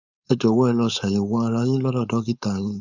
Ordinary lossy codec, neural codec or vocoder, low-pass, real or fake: none; codec, 16 kHz, 4.8 kbps, FACodec; 7.2 kHz; fake